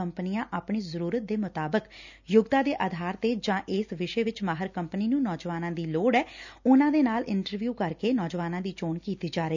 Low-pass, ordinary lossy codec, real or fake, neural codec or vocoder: 7.2 kHz; none; real; none